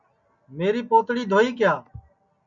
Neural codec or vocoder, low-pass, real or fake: none; 7.2 kHz; real